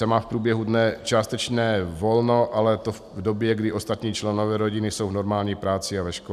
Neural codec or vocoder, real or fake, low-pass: none; real; 14.4 kHz